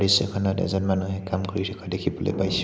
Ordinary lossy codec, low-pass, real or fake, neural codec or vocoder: none; none; real; none